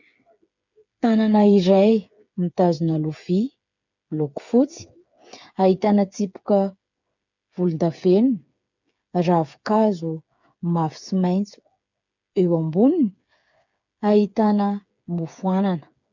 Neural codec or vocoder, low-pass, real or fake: codec, 16 kHz, 8 kbps, FreqCodec, smaller model; 7.2 kHz; fake